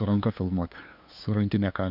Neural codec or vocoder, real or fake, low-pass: codec, 16 kHz, 2 kbps, FunCodec, trained on LibriTTS, 25 frames a second; fake; 5.4 kHz